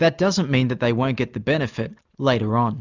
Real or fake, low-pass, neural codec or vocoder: real; 7.2 kHz; none